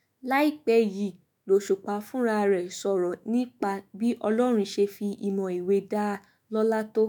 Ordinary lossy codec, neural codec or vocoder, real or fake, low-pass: none; autoencoder, 48 kHz, 128 numbers a frame, DAC-VAE, trained on Japanese speech; fake; 19.8 kHz